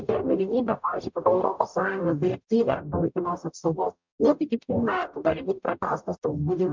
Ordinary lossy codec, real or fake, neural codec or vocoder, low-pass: MP3, 64 kbps; fake; codec, 44.1 kHz, 0.9 kbps, DAC; 7.2 kHz